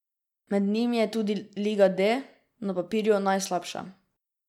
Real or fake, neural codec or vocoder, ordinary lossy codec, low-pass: real; none; none; 19.8 kHz